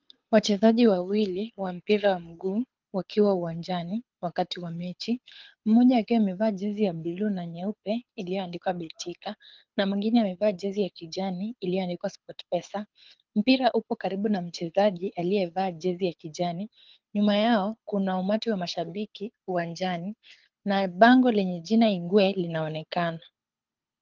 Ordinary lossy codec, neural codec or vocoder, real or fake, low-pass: Opus, 24 kbps; codec, 24 kHz, 6 kbps, HILCodec; fake; 7.2 kHz